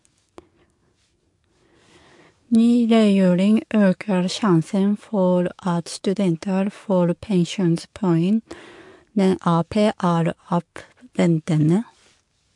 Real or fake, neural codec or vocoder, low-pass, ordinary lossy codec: fake; codec, 44.1 kHz, 7.8 kbps, DAC; 10.8 kHz; MP3, 64 kbps